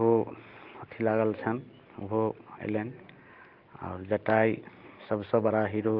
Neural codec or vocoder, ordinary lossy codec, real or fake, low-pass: none; Opus, 24 kbps; real; 5.4 kHz